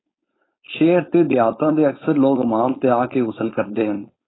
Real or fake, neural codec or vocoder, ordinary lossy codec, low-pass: fake; codec, 16 kHz, 4.8 kbps, FACodec; AAC, 16 kbps; 7.2 kHz